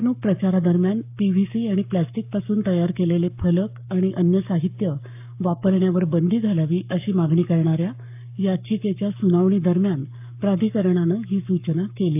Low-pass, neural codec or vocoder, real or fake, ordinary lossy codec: 3.6 kHz; codec, 44.1 kHz, 7.8 kbps, Pupu-Codec; fake; AAC, 32 kbps